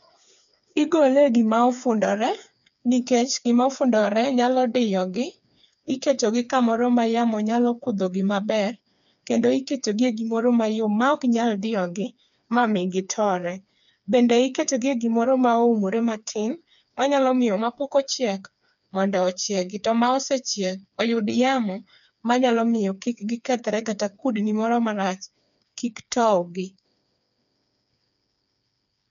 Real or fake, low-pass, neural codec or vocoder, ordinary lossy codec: fake; 7.2 kHz; codec, 16 kHz, 4 kbps, FreqCodec, smaller model; none